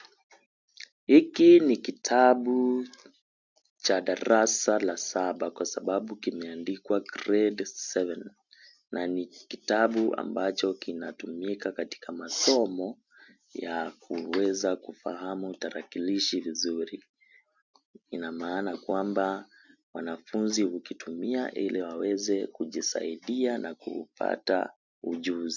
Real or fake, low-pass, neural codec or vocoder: real; 7.2 kHz; none